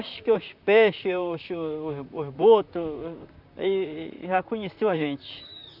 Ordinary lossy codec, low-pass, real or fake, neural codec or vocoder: none; 5.4 kHz; fake; vocoder, 44.1 kHz, 128 mel bands, Pupu-Vocoder